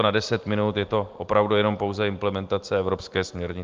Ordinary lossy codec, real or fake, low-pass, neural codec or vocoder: Opus, 32 kbps; real; 7.2 kHz; none